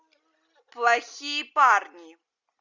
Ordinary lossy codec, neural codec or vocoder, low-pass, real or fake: Opus, 64 kbps; none; 7.2 kHz; real